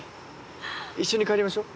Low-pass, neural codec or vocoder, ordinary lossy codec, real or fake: none; none; none; real